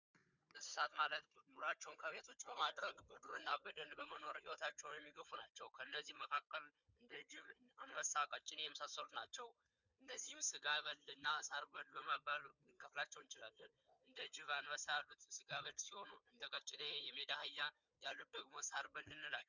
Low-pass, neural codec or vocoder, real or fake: 7.2 kHz; codec, 16 kHz, 4 kbps, FunCodec, trained on Chinese and English, 50 frames a second; fake